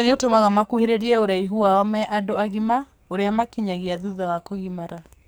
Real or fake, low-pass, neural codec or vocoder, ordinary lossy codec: fake; none; codec, 44.1 kHz, 2.6 kbps, SNAC; none